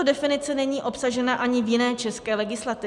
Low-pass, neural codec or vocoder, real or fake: 10.8 kHz; none; real